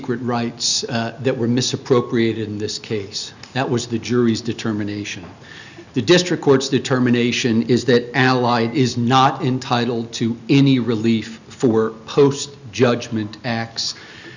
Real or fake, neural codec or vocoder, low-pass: real; none; 7.2 kHz